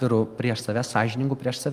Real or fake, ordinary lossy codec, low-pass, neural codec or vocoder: real; Opus, 32 kbps; 14.4 kHz; none